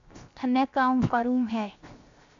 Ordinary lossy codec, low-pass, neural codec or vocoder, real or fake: AAC, 64 kbps; 7.2 kHz; codec, 16 kHz, 0.7 kbps, FocalCodec; fake